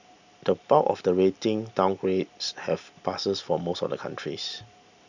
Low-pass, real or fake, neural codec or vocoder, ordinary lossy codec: 7.2 kHz; real; none; none